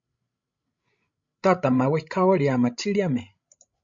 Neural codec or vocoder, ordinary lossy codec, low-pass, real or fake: codec, 16 kHz, 16 kbps, FreqCodec, larger model; MP3, 48 kbps; 7.2 kHz; fake